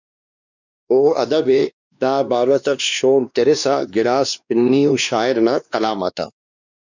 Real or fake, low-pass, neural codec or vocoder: fake; 7.2 kHz; codec, 16 kHz, 2 kbps, X-Codec, WavLM features, trained on Multilingual LibriSpeech